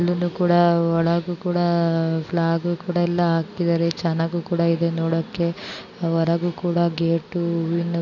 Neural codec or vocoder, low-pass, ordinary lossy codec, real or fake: none; 7.2 kHz; none; real